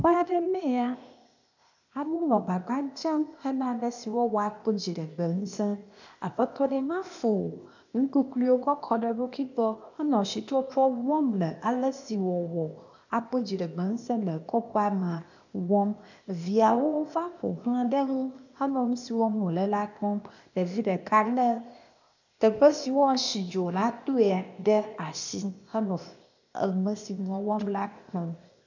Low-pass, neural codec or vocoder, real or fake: 7.2 kHz; codec, 16 kHz, 0.8 kbps, ZipCodec; fake